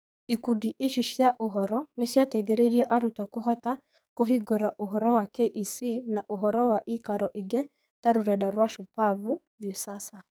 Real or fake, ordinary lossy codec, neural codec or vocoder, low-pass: fake; none; codec, 44.1 kHz, 2.6 kbps, SNAC; none